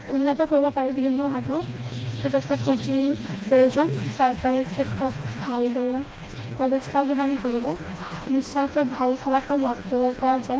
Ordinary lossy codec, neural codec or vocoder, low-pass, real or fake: none; codec, 16 kHz, 1 kbps, FreqCodec, smaller model; none; fake